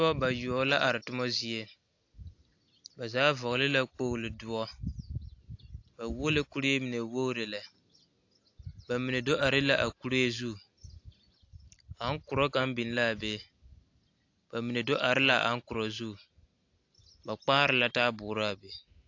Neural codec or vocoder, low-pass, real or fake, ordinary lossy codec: none; 7.2 kHz; real; AAC, 48 kbps